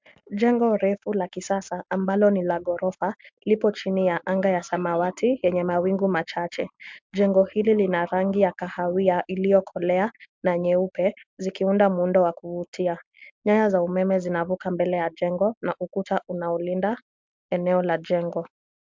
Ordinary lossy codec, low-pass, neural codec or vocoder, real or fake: MP3, 64 kbps; 7.2 kHz; none; real